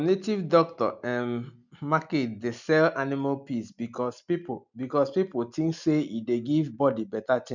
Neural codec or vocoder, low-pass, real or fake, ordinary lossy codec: none; 7.2 kHz; real; none